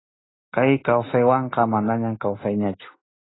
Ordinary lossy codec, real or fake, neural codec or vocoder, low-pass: AAC, 16 kbps; real; none; 7.2 kHz